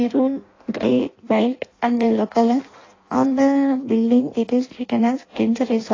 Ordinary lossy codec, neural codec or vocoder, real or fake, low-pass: AAC, 32 kbps; codec, 16 kHz in and 24 kHz out, 0.6 kbps, FireRedTTS-2 codec; fake; 7.2 kHz